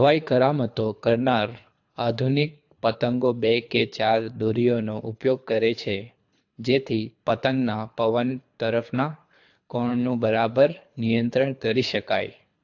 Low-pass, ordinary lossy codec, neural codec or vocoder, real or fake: 7.2 kHz; AAC, 48 kbps; codec, 24 kHz, 3 kbps, HILCodec; fake